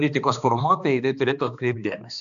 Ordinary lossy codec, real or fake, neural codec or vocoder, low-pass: AAC, 96 kbps; fake; codec, 16 kHz, 2 kbps, X-Codec, HuBERT features, trained on balanced general audio; 7.2 kHz